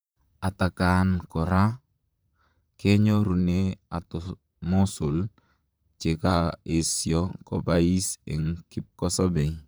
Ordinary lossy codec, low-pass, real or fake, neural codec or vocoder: none; none; fake; vocoder, 44.1 kHz, 128 mel bands, Pupu-Vocoder